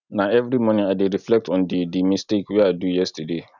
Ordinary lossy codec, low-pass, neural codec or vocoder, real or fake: none; 7.2 kHz; none; real